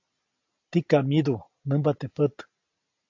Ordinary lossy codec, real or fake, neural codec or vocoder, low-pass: AAC, 48 kbps; real; none; 7.2 kHz